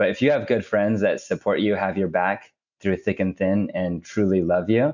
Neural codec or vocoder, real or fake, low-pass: none; real; 7.2 kHz